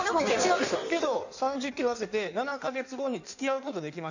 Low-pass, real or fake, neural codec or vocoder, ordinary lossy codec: 7.2 kHz; fake; codec, 16 kHz in and 24 kHz out, 1.1 kbps, FireRedTTS-2 codec; none